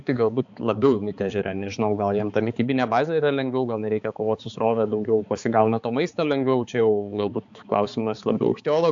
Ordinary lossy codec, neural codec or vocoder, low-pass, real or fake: AAC, 64 kbps; codec, 16 kHz, 4 kbps, X-Codec, HuBERT features, trained on balanced general audio; 7.2 kHz; fake